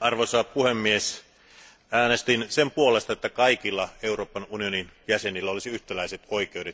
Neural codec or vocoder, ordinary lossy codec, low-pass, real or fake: none; none; none; real